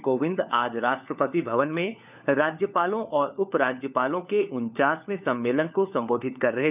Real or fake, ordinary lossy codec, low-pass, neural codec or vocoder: fake; none; 3.6 kHz; codec, 16 kHz, 4 kbps, FunCodec, trained on LibriTTS, 50 frames a second